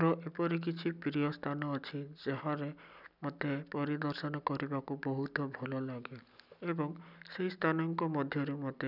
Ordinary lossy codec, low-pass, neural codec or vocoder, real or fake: none; 5.4 kHz; none; real